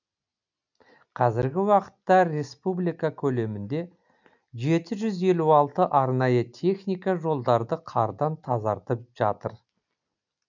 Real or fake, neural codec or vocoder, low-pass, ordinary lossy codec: real; none; 7.2 kHz; none